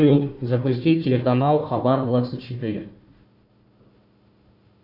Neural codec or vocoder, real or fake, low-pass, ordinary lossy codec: codec, 16 kHz, 1 kbps, FunCodec, trained on Chinese and English, 50 frames a second; fake; 5.4 kHz; AAC, 48 kbps